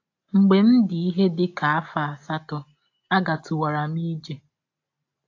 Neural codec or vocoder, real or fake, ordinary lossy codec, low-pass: none; real; AAC, 48 kbps; 7.2 kHz